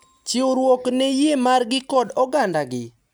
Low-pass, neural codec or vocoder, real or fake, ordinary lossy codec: none; none; real; none